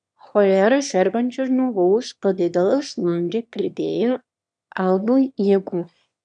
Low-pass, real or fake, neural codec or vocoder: 9.9 kHz; fake; autoencoder, 22.05 kHz, a latent of 192 numbers a frame, VITS, trained on one speaker